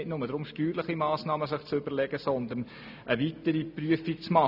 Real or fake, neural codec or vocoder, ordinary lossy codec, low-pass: real; none; none; 5.4 kHz